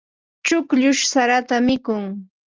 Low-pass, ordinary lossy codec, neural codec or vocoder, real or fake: 7.2 kHz; Opus, 24 kbps; none; real